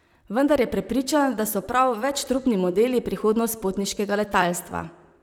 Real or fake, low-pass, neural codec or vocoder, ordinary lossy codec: fake; 19.8 kHz; vocoder, 44.1 kHz, 128 mel bands, Pupu-Vocoder; none